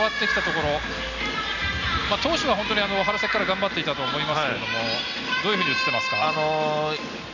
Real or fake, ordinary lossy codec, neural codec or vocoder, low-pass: real; none; none; 7.2 kHz